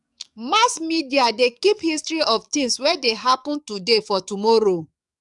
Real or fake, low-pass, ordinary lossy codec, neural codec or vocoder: fake; 10.8 kHz; none; codec, 44.1 kHz, 7.8 kbps, DAC